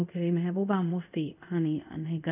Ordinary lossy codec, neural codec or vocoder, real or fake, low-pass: none; codec, 24 kHz, 0.5 kbps, DualCodec; fake; 3.6 kHz